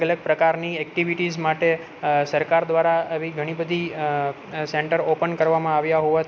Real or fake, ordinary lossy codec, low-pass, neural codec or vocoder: real; none; none; none